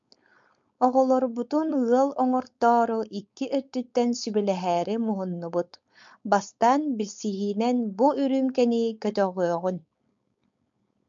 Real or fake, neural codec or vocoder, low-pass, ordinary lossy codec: fake; codec, 16 kHz, 4.8 kbps, FACodec; 7.2 kHz; MP3, 64 kbps